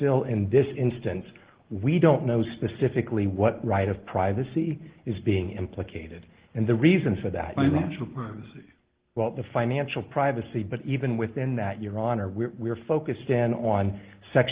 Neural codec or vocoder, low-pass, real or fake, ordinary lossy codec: none; 3.6 kHz; real; Opus, 16 kbps